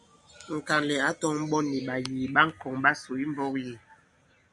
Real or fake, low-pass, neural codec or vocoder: real; 10.8 kHz; none